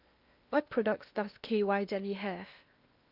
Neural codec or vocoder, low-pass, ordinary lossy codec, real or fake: codec, 16 kHz in and 24 kHz out, 0.8 kbps, FocalCodec, streaming, 65536 codes; 5.4 kHz; Opus, 64 kbps; fake